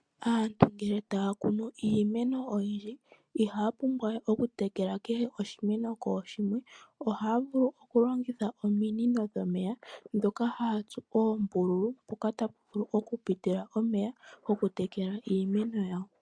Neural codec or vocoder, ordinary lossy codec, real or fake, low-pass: none; MP3, 64 kbps; real; 9.9 kHz